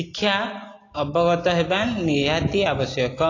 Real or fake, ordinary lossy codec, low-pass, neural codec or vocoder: real; AAC, 32 kbps; 7.2 kHz; none